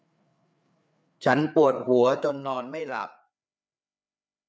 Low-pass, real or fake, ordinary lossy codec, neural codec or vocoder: none; fake; none; codec, 16 kHz, 4 kbps, FreqCodec, larger model